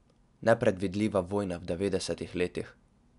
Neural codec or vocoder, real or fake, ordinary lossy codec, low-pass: none; real; none; 10.8 kHz